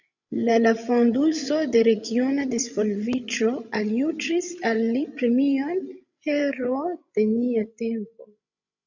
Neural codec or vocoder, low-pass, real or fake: codec, 16 kHz, 16 kbps, FreqCodec, larger model; 7.2 kHz; fake